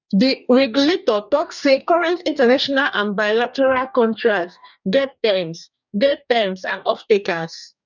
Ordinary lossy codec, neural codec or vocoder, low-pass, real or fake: none; codec, 44.1 kHz, 2.6 kbps, DAC; 7.2 kHz; fake